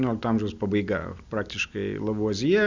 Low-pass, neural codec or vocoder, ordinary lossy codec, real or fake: 7.2 kHz; none; Opus, 64 kbps; real